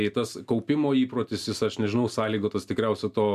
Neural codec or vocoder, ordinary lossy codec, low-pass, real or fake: none; MP3, 96 kbps; 14.4 kHz; real